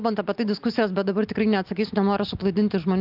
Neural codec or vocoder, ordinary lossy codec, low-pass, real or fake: none; Opus, 32 kbps; 5.4 kHz; real